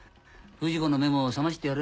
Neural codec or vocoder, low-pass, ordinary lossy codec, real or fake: none; none; none; real